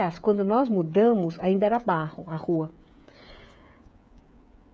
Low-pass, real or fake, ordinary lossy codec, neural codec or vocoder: none; fake; none; codec, 16 kHz, 16 kbps, FreqCodec, smaller model